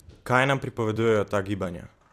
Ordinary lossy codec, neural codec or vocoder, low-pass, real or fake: AAC, 64 kbps; vocoder, 44.1 kHz, 128 mel bands every 256 samples, BigVGAN v2; 14.4 kHz; fake